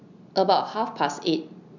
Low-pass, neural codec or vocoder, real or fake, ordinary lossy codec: 7.2 kHz; none; real; none